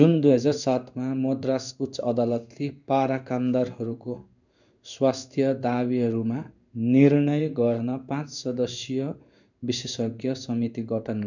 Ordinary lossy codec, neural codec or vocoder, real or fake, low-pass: none; codec, 16 kHz in and 24 kHz out, 1 kbps, XY-Tokenizer; fake; 7.2 kHz